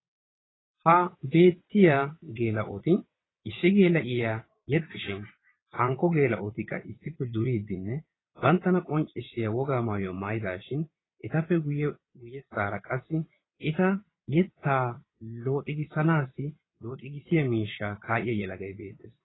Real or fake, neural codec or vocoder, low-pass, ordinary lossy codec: fake; vocoder, 22.05 kHz, 80 mel bands, WaveNeXt; 7.2 kHz; AAC, 16 kbps